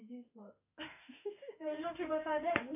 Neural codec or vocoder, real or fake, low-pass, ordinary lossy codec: autoencoder, 48 kHz, 32 numbers a frame, DAC-VAE, trained on Japanese speech; fake; 3.6 kHz; none